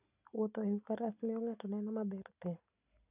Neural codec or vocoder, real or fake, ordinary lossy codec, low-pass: none; real; none; 3.6 kHz